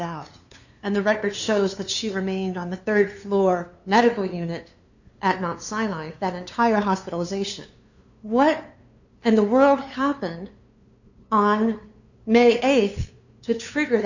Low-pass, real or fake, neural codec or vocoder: 7.2 kHz; fake; codec, 16 kHz, 2 kbps, FunCodec, trained on LibriTTS, 25 frames a second